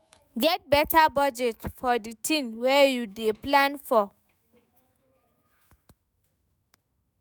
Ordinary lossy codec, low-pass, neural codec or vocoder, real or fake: none; none; autoencoder, 48 kHz, 128 numbers a frame, DAC-VAE, trained on Japanese speech; fake